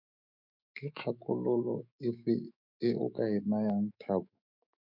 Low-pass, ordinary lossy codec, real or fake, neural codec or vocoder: 5.4 kHz; MP3, 48 kbps; fake; autoencoder, 48 kHz, 128 numbers a frame, DAC-VAE, trained on Japanese speech